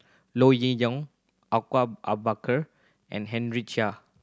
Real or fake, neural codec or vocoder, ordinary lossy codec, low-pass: real; none; none; none